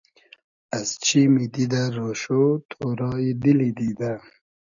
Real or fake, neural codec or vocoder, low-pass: real; none; 7.2 kHz